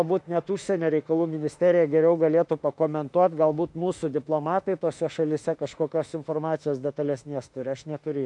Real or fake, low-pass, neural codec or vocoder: fake; 10.8 kHz; autoencoder, 48 kHz, 32 numbers a frame, DAC-VAE, trained on Japanese speech